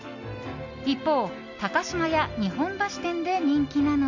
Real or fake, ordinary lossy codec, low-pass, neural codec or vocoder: real; none; 7.2 kHz; none